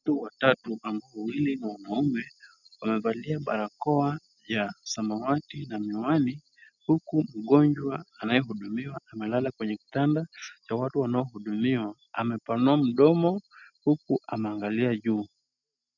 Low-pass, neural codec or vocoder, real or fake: 7.2 kHz; none; real